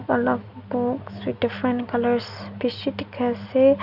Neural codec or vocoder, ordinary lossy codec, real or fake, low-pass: none; none; real; 5.4 kHz